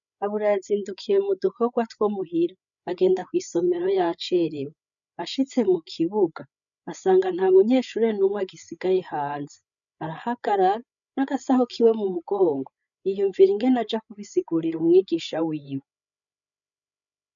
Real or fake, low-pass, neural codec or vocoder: fake; 7.2 kHz; codec, 16 kHz, 8 kbps, FreqCodec, larger model